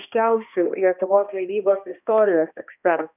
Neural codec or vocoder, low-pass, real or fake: codec, 16 kHz, 1 kbps, X-Codec, HuBERT features, trained on balanced general audio; 3.6 kHz; fake